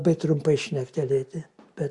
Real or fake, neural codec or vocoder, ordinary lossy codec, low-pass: real; none; Opus, 64 kbps; 10.8 kHz